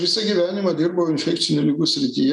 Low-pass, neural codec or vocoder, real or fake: 10.8 kHz; none; real